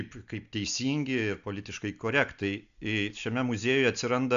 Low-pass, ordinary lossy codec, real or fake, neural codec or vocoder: 7.2 kHz; AAC, 96 kbps; real; none